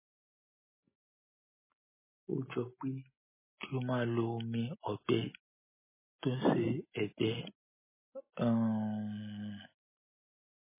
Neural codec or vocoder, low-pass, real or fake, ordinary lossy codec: none; 3.6 kHz; real; MP3, 16 kbps